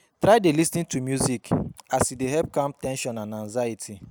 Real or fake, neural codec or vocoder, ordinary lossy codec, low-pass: real; none; none; none